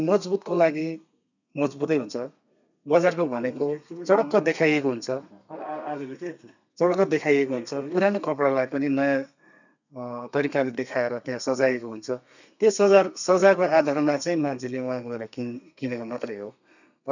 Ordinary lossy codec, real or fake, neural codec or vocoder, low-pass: none; fake; codec, 32 kHz, 1.9 kbps, SNAC; 7.2 kHz